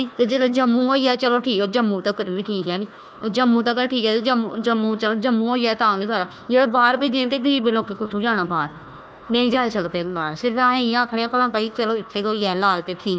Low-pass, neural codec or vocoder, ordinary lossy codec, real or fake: none; codec, 16 kHz, 1 kbps, FunCodec, trained on Chinese and English, 50 frames a second; none; fake